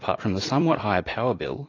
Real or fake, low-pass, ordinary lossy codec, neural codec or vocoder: fake; 7.2 kHz; AAC, 32 kbps; vocoder, 44.1 kHz, 128 mel bands every 256 samples, BigVGAN v2